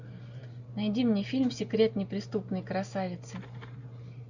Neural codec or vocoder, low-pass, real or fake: none; 7.2 kHz; real